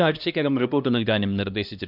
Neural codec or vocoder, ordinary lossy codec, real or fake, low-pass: codec, 16 kHz, 1 kbps, X-Codec, HuBERT features, trained on LibriSpeech; none; fake; 5.4 kHz